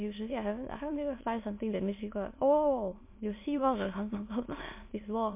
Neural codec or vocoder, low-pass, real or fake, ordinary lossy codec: autoencoder, 22.05 kHz, a latent of 192 numbers a frame, VITS, trained on many speakers; 3.6 kHz; fake; MP3, 24 kbps